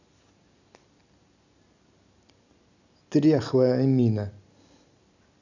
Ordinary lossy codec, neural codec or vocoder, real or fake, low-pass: none; none; real; 7.2 kHz